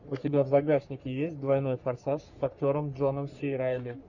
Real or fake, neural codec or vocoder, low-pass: fake; codec, 44.1 kHz, 3.4 kbps, Pupu-Codec; 7.2 kHz